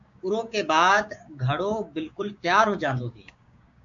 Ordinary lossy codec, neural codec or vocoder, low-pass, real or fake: AAC, 64 kbps; codec, 16 kHz, 6 kbps, DAC; 7.2 kHz; fake